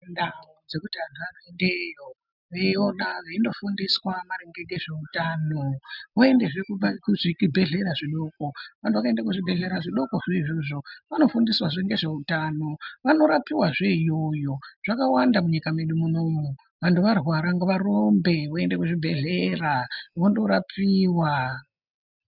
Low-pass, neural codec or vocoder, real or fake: 5.4 kHz; none; real